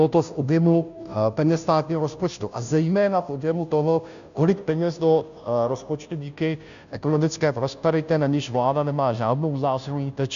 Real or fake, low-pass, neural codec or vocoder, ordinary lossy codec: fake; 7.2 kHz; codec, 16 kHz, 0.5 kbps, FunCodec, trained on Chinese and English, 25 frames a second; MP3, 96 kbps